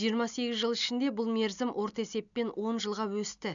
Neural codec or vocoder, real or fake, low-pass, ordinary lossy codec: none; real; 7.2 kHz; none